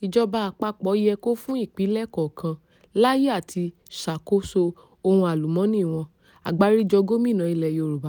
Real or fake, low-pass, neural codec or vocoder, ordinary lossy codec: real; none; none; none